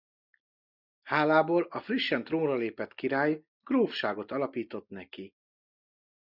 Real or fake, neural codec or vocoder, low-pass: real; none; 5.4 kHz